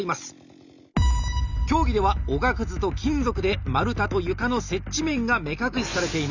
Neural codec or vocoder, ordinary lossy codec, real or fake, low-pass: none; none; real; 7.2 kHz